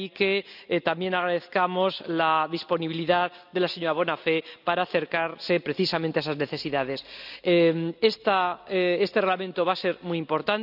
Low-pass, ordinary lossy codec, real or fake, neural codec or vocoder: 5.4 kHz; none; real; none